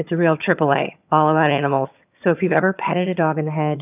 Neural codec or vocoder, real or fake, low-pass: vocoder, 22.05 kHz, 80 mel bands, HiFi-GAN; fake; 3.6 kHz